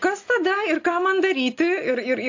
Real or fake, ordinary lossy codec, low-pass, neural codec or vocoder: real; AAC, 48 kbps; 7.2 kHz; none